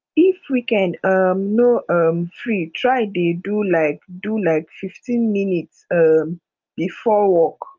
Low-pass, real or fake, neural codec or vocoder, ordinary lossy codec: 7.2 kHz; real; none; Opus, 24 kbps